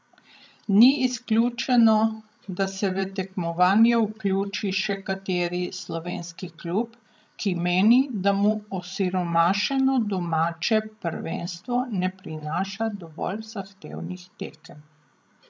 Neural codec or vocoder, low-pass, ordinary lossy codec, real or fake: codec, 16 kHz, 16 kbps, FreqCodec, larger model; none; none; fake